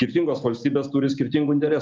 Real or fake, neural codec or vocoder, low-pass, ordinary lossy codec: real; none; 7.2 kHz; Opus, 24 kbps